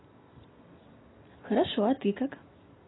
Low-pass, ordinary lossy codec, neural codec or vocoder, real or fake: 7.2 kHz; AAC, 16 kbps; none; real